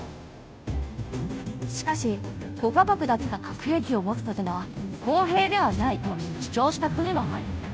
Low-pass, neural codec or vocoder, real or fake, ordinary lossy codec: none; codec, 16 kHz, 0.5 kbps, FunCodec, trained on Chinese and English, 25 frames a second; fake; none